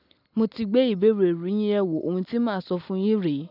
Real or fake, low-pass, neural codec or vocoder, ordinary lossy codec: real; 5.4 kHz; none; none